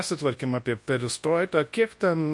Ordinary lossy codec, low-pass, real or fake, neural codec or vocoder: MP3, 48 kbps; 10.8 kHz; fake; codec, 24 kHz, 0.9 kbps, WavTokenizer, large speech release